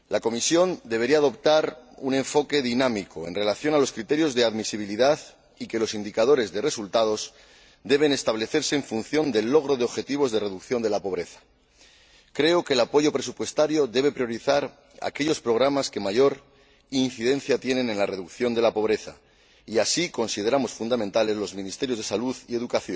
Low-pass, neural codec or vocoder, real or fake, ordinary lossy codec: none; none; real; none